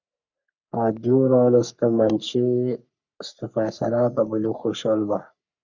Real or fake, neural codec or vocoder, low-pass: fake; codec, 44.1 kHz, 3.4 kbps, Pupu-Codec; 7.2 kHz